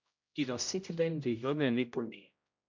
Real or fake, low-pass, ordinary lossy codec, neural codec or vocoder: fake; 7.2 kHz; MP3, 64 kbps; codec, 16 kHz, 0.5 kbps, X-Codec, HuBERT features, trained on general audio